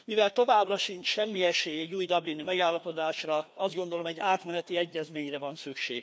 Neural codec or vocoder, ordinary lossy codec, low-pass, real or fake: codec, 16 kHz, 2 kbps, FreqCodec, larger model; none; none; fake